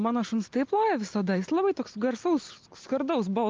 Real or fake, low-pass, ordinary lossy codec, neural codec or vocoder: real; 7.2 kHz; Opus, 32 kbps; none